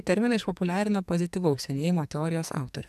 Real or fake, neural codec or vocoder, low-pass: fake; codec, 44.1 kHz, 2.6 kbps, SNAC; 14.4 kHz